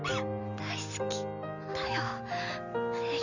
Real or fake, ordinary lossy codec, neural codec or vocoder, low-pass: real; none; none; 7.2 kHz